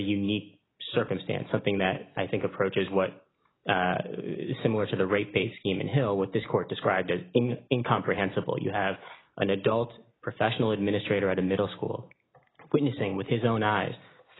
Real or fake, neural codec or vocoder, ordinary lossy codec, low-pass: real; none; AAC, 16 kbps; 7.2 kHz